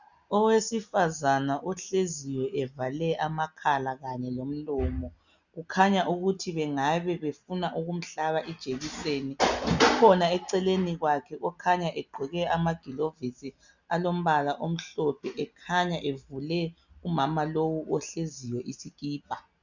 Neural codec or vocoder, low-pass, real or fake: none; 7.2 kHz; real